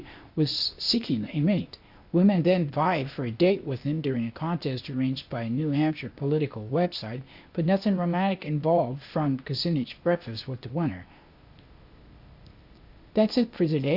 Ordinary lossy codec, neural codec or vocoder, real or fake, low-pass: Opus, 64 kbps; codec, 16 kHz, 0.8 kbps, ZipCodec; fake; 5.4 kHz